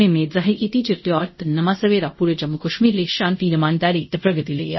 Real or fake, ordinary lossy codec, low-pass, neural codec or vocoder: fake; MP3, 24 kbps; 7.2 kHz; codec, 24 kHz, 0.5 kbps, DualCodec